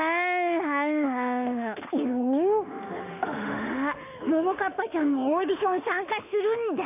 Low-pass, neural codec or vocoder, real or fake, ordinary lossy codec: 3.6 kHz; autoencoder, 48 kHz, 32 numbers a frame, DAC-VAE, trained on Japanese speech; fake; none